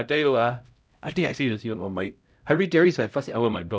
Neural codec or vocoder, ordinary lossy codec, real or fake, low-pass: codec, 16 kHz, 0.5 kbps, X-Codec, HuBERT features, trained on LibriSpeech; none; fake; none